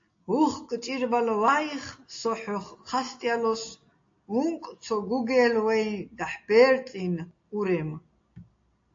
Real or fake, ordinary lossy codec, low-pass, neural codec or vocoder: real; AAC, 48 kbps; 7.2 kHz; none